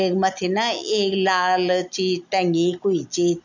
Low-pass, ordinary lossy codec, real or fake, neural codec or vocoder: 7.2 kHz; none; real; none